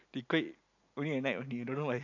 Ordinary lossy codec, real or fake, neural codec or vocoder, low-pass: AAC, 48 kbps; real; none; 7.2 kHz